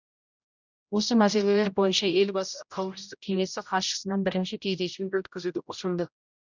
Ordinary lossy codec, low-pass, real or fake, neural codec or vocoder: none; 7.2 kHz; fake; codec, 16 kHz, 0.5 kbps, X-Codec, HuBERT features, trained on general audio